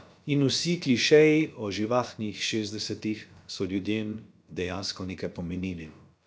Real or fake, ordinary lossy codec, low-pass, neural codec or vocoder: fake; none; none; codec, 16 kHz, about 1 kbps, DyCAST, with the encoder's durations